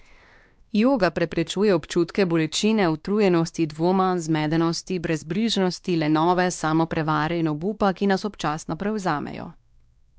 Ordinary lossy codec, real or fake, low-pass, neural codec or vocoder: none; fake; none; codec, 16 kHz, 2 kbps, X-Codec, WavLM features, trained on Multilingual LibriSpeech